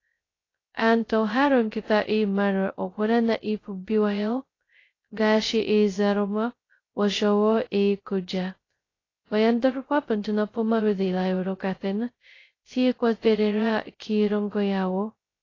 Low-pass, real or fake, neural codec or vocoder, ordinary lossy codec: 7.2 kHz; fake; codec, 16 kHz, 0.2 kbps, FocalCodec; AAC, 32 kbps